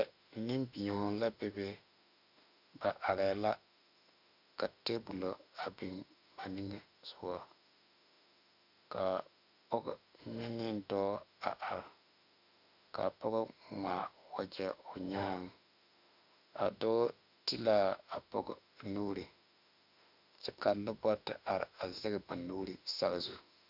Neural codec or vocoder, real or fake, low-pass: autoencoder, 48 kHz, 32 numbers a frame, DAC-VAE, trained on Japanese speech; fake; 5.4 kHz